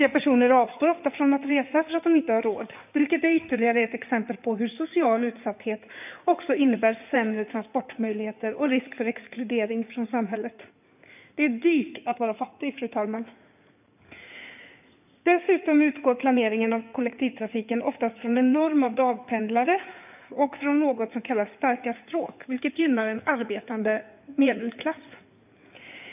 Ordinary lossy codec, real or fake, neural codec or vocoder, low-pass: none; fake; codec, 16 kHz in and 24 kHz out, 2.2 kbps, FireRedTTS-2 codec; 3.6 kHz